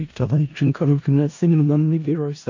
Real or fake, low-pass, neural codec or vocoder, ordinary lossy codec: fake; 7.2 kHz; codec, 16 kHz in and 24 kHz out, 0.4 kbps, LongCat-Audio-Codec, four codebook decoder; AAC, 48 kbps